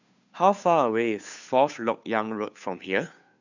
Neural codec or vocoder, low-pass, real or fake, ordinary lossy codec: codec, 16 kHz, 2 kbps, FunCodec, trained on Chinese and English, 25 frames a second; 7.2 kHz; fake; none